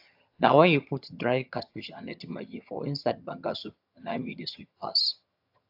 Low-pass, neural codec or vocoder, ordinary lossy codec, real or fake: 5.4 kHz; vocoder, 22.05 kHz, 80 mel bands, HiFi-GAN; AAC, 48 kbps; fake